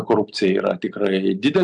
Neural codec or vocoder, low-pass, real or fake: none; 10.8 kHz; real